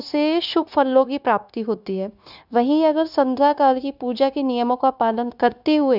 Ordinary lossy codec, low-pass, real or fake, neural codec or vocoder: none; 5.4 kHz; fake; codec, 16 kHz, 0.9 kbps, LongCat-Audio-Codec